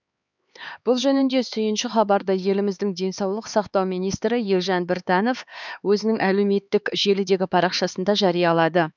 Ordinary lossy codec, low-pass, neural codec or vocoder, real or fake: none; 7.2 kHz; codec, 16 kHz, 4 kbps, X-Codec, HuBERT features, trained on LibriSpeech; fake